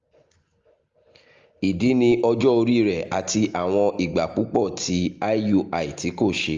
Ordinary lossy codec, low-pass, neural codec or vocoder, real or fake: Opus, 32 kbps; 7.2 kHz; none; real